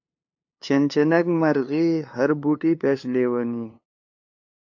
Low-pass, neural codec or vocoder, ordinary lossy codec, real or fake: 7.2 kHz; codec, 16 kHz, 2 kbps, FunCodec, trained on LibriTTS, 25 frames a second; AAC, 48 kbps; fake